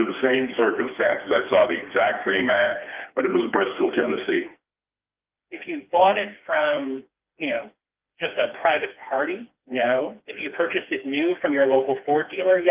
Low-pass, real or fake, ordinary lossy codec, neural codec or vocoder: 3.6 kHz; fake; Opus, 32 kbps; codec, 16 kHz, 2 kbps, FreqCodec, smaller model